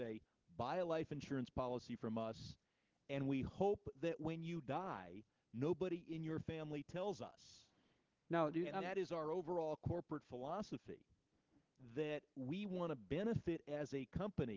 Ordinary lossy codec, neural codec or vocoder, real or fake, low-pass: Opus, 16 kbps; none; real; 7.2 kHz